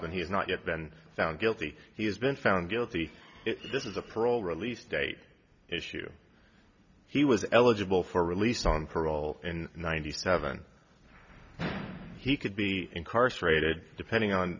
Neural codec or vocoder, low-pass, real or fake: none; 7.2 kHz; real